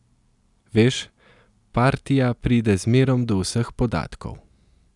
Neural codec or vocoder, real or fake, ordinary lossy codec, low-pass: none; real; none; 10.8 kHz